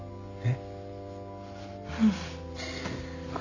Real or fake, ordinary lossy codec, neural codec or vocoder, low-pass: real; none; none; 7.2 kHz